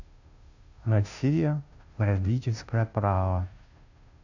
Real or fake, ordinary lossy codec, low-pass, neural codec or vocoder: fake; none; 7.2 kHz; codec, 16 kHz, 0.5 kbps, FunCodec, trained on Chinese and English, 25 frames a second